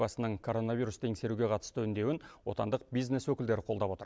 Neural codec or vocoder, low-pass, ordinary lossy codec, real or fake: none; none; none; real